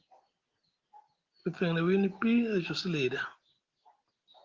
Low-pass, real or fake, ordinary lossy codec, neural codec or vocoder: 7.2 kHz; real; Opus, 16 kbps; none